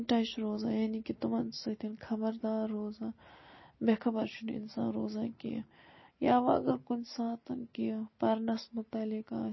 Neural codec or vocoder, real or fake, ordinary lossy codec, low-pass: none; real; MP3, 24 kbps; 7.2 kHz